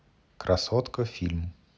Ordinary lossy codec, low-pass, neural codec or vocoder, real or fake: none; none; none; real